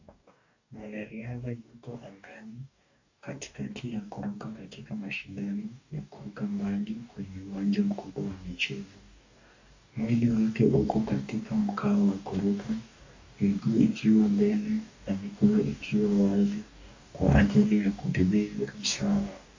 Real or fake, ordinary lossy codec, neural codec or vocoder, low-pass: fake; AAC, 48 kbps; codec, 44.1 kHz, 2.6 kbps, DAC; 7.2 kHz